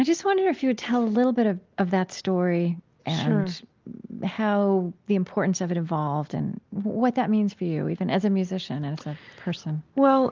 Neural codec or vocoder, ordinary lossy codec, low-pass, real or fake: none; Opus, 24 kbps; 7.2 kHz; real